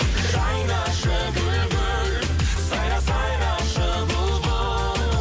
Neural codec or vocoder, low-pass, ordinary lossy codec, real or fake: none; none; none; real